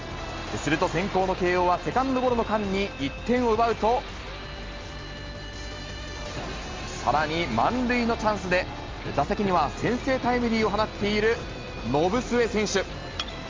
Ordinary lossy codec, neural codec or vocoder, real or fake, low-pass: Opus, 32 kbps; none; real; 7.2 kHz